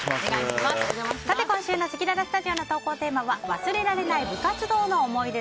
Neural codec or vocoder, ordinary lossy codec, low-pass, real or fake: none; none; none; real